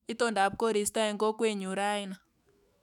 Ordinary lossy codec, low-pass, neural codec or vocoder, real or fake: none; 19.8 kHz; autoencoder, 48 kHz, 128 numbers a frame, DAC-VAE, trained on Japanese speech; fake